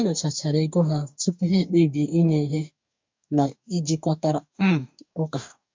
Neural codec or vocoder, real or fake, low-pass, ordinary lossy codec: codec, 44.1 kHz, 2.6 kbps, DAC; fake; 7.2 kHz; MP3, 64 kbps